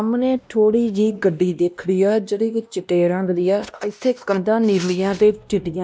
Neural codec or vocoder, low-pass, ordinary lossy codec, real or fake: codec, 16 kHz, 1 kbps, X-Codec, WavLM features, trained on Multilingual LibriSpeech; none; none; fake